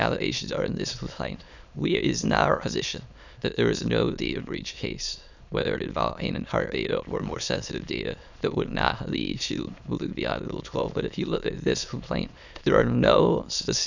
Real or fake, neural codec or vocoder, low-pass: fake; autoencoder, 22.05 kHz, a latent of 192 numbers a frame, VITS, trained on many speakers; 7.2 kHz